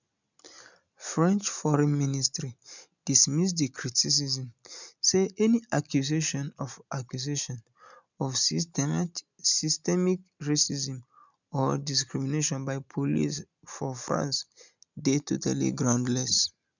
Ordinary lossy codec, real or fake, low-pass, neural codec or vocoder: none; real; 7.2 kHz; none